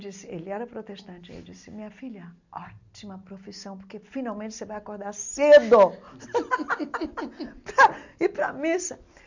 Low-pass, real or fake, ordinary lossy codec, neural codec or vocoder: 7.2 kHz; real; none; none